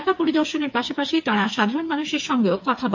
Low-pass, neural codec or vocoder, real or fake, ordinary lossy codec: 7.2 kHz; codec, 16 kHz, 4 kbps, FreqCodec, smaller model; fake; MP3, 48 kbps